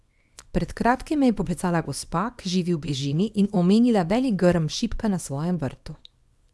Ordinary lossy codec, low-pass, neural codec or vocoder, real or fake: none; none; codec, 24 kHz, 0.9 kbps, WavTokenizer, small release; fake